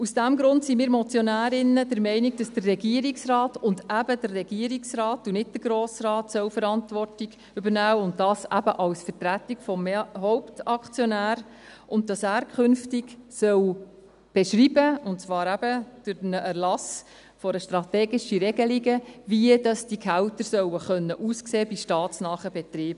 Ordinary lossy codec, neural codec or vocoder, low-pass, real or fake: none; none; 10.8 kHz; real